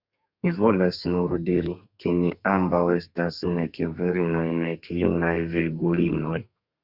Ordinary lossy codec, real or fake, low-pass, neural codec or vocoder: Opus, 64 kbps; fake; 5.4 kHz; codec, 32 kHz, 1.9 kbps, SNAC